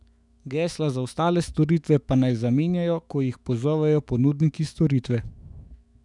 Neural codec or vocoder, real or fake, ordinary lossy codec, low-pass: autoencoder, 48 kHz, 128 numbers a frame, DAC-VAE, trained on Japanese speech; fake; none; 10.8 kHz